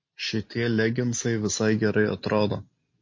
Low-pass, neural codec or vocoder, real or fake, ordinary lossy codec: 7.2 kHz; none; real; MP3, 32 kbps